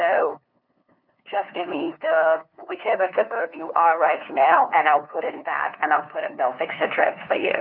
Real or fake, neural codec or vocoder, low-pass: fake; codec, 16 kHz, 2 kbps, FunCodec, trained on LibriTTS, 25 frames a second; 5.4 kHz